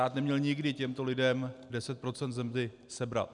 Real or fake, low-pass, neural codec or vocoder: real; 10.8 kHz; none